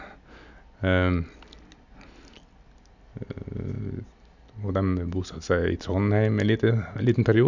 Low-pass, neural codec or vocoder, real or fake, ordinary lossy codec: 7.2 kHz; none; real; none